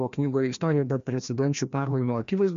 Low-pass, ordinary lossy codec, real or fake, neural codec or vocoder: 7.2 kHz; MP3, 64 kbps; fake; codec, 16 kHz, 1 kbps, FreqCodec, larger model